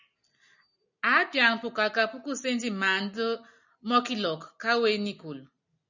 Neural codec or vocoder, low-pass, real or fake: none; 7.2 kHz; real